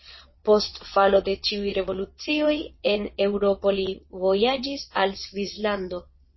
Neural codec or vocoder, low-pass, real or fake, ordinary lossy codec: vocoder, 22.05 kHz, 80 mel bands, WaveNeXt; 7.2 kHz; fake; MP3, 24 kbps